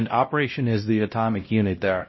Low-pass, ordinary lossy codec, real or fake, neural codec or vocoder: 7.2 kHz; MP3, 24 kbps; fake; codec, 16 kHz, 0.5 kbps, X-Codec, WavLM features, trained on Multilingual LibriSpeech